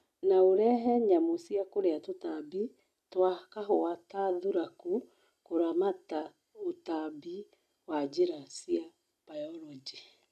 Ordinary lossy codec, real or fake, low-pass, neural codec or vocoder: none; real; 14.4 kHz; none